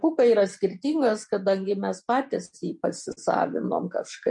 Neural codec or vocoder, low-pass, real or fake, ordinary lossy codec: none; 10.8 kHz; real; MP3, 48 kbps